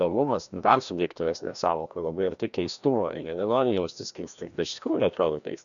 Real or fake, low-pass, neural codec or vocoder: fake; 7.2 kHz; codec, 16 kHz, 1 kbps, FreqCodec, larger model